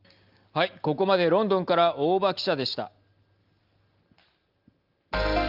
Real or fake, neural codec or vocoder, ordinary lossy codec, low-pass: real; none; Opus, 24 kbps; 5.4 kHz